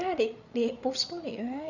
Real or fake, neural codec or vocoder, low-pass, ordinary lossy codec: fake; codec, 16 kHz, 8 kbps, FreqCodec, larger model; 7.2 kHz; none